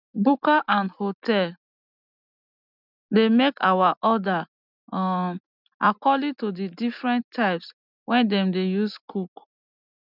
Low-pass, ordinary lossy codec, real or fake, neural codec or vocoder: 5.4 kHz; none; real; none